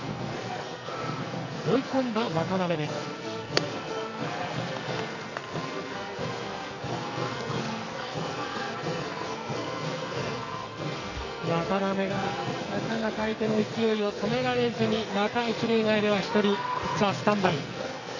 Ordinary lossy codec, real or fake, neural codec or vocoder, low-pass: none; fake; codec, 44.1 kHz, 2.6 kbps, SNAC; 7.2 kHz